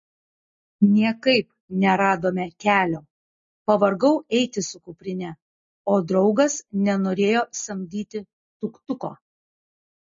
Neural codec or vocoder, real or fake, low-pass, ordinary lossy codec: none; real; 7.2 kHz; MP3, 32 kbps